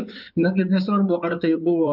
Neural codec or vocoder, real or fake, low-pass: codec, 16 kHz in and 24 kHz out, 2.2 kbps, FireRedTTS-2 codec; fake; 5.4 kHz